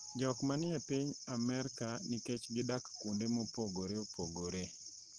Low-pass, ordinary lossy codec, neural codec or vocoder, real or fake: 9.9 kHz; Opus, 16 kbps; none; real